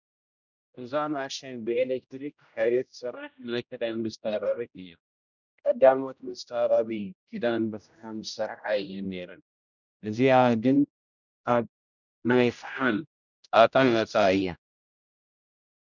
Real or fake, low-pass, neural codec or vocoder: fake; 7.2 kHz; codec, 16 kHz, 0.5 kbps, X-Codec, HuBERT features, trained on general audio